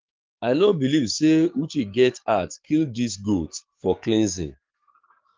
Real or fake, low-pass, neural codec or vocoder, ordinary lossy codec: fake; 7.2 kHz; codec, 44.1 kHz, 7.8 kbps, DAC; Opus, 32 kbps